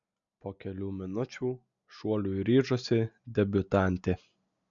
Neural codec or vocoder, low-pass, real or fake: none; 7.2 kHz; real